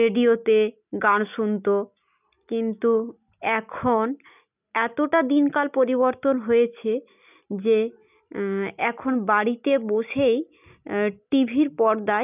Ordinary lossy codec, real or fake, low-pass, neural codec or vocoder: none; real; 3.6 kHz; none